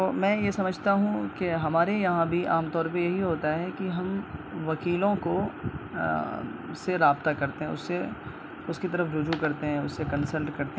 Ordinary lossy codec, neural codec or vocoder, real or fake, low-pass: none; none; real; none